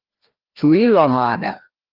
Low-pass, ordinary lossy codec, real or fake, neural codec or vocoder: 5.4 kHz; Opus, 16 kbps; fake; codec, 16 kHz, 1 kbps, FreqCodec, larger model